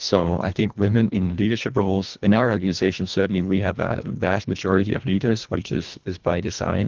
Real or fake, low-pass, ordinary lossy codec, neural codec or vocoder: fake; 7.2 kHz; Opus, 32 kbps; codec, 24 kHz, 1.5 kbps, HILCodec